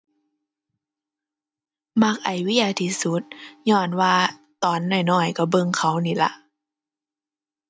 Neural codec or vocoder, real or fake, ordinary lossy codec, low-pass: none; real; none; none